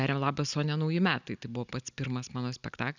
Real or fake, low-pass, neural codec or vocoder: real; 7.2 kHz; none